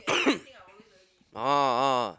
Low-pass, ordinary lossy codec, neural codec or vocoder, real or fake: none; none; none; real